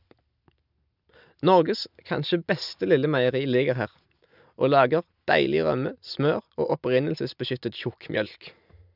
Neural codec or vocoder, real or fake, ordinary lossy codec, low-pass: none; real; none; 5.4 kHz